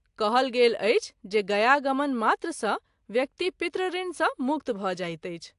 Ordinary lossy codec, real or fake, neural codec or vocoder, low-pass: AAC, 64 kbps; real; none; 10.8 kHz